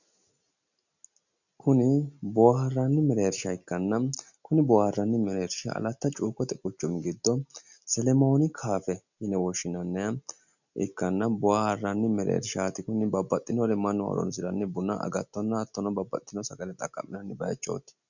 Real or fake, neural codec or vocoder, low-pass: real; none; 7.2 kHz